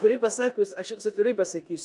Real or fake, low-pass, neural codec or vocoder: fake; 10.8 kHz; codec, 16 kHz in and 24 kHz out, 0.9 kbps, LongCat-Audio-Codec, four codebook decoder